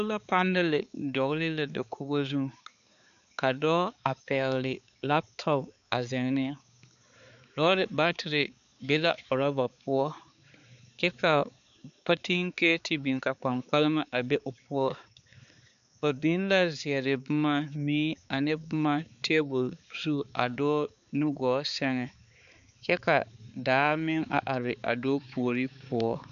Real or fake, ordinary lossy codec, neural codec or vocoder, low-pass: fake; MP3, 96 kbps; codec, 16 kHz, 4 kbps, X-Codec, HuBERT features, trained on balanced general audio; 7.2 kHz